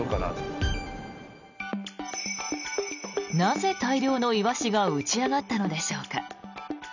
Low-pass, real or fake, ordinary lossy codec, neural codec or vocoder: 7.2 kHz; real; none; none